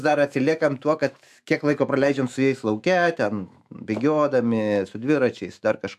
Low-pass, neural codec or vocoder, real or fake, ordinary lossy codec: 14.4 kHz; autoencoder, 48 kHz, 128 numbers a frame, DAC-VAE, trained on Japanese speech; fake; AAC, 96 kbps